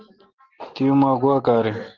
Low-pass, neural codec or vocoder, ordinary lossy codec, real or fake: 7.2 kHz; none; Opus, 16 kbps; real